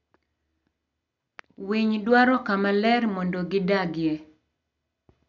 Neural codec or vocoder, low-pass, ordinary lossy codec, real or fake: none; 7.2 kHz; none; real